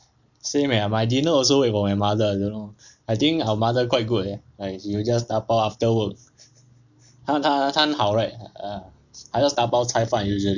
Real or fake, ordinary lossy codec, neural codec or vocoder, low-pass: real; none; none; 7.2 kHz